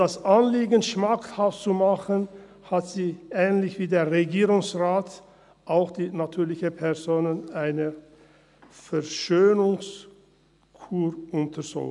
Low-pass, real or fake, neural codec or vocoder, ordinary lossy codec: 10.8 kHz; real; none; none